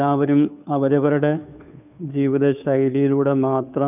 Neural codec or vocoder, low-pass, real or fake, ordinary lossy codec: codec, 16 kHz, 2 kbps, FunCodec, trained on Chinese and English, 25 frames a second; 3.6 kHz; fake; none